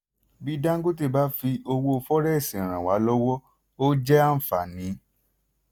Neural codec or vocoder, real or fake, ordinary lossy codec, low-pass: none; real; none; none